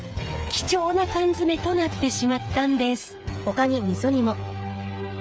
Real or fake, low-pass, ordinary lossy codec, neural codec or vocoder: fake; none; none; codec, 16 kHz, 8 kbps, FreqCodec, smaller model